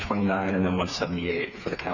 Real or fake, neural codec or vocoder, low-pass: fake; codec, 16 kHz, 4 kbps, FreqCodec, smaller model; 7.2 kHz